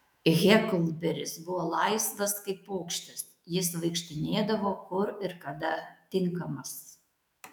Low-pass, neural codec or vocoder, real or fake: 19.8 kHz; autoencoder, 48 kHz, 128 numbers a frame, DAC-VAE, trained on Japanese speech; fake